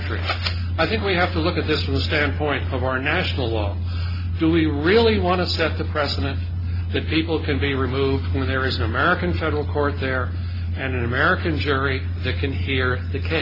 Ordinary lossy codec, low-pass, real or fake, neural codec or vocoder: AAC, 32 kbps; 5.4 kHz; real; none